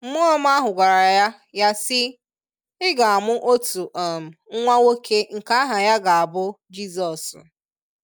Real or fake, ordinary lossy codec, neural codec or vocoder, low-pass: real; none; none; none